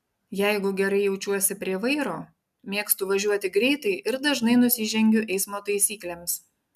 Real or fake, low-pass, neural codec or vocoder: real; 14.4 kHz; none